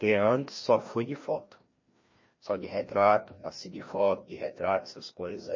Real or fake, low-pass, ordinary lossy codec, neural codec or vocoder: fake; 7.2 kHz; MP3, 32 kbps; codec, 16 kHz, 1 kbps, FreqCodec, larger model